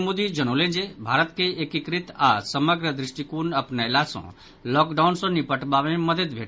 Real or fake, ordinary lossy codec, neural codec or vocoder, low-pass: real; none; none; none